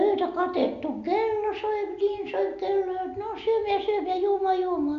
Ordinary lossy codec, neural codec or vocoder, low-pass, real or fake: none; none; 7.2 kHz; real